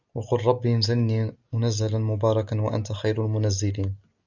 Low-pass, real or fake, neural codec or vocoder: 7.2 kHz; real; none